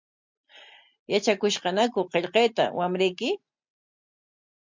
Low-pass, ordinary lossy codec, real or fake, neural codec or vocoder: 7.2 kHz; MP3, 64 kbps; real; none